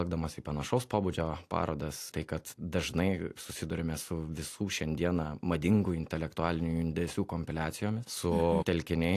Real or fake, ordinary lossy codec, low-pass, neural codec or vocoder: real; AAC, 64 kbps; 14.4 kHz; none